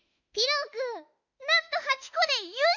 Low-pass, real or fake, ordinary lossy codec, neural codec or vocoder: 7.2 kHz; fake; none; autoencoder, 48 kHz, 32 numbers a frame, DAC-VAE, trained on Japanese speech